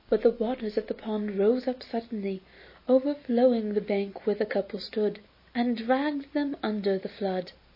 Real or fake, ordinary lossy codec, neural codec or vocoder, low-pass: real; MP3, 24 kbps; none; 5.4 kHz